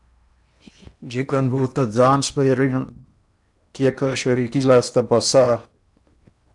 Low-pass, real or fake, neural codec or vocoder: 10.8 kHz; fake; codec, 16 kHz in and 24 kHz out, 0.8 kbps, FocalCodec, streaming, 65536 codes